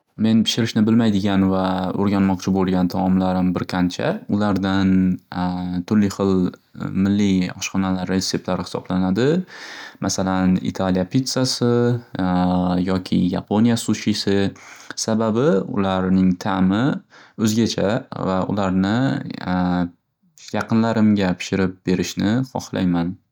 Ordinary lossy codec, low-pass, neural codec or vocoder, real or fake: none; 19.8 kHz; none; real